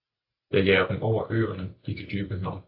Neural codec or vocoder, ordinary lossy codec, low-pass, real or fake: none; AAC, 48 kbps; 5.4 kHz; real